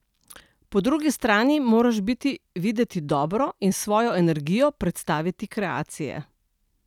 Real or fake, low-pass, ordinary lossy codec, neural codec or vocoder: real; 19.8 kHz; none; none